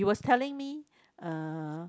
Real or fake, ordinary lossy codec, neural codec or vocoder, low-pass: real; none; none; none